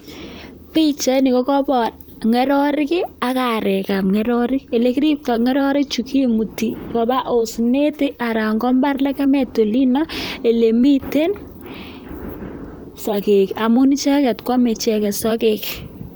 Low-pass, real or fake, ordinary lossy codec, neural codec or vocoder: none; fake; none; vocoder, 44.1 kHz, 128 mel bands, Pupu-Vocoder